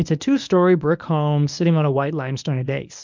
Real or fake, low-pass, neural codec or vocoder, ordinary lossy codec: fake; 7.2 kHz; codec, 24 kHz, 0.9 kbps, WavTokenizer, medium speech release version 1; MP3, 64 kbps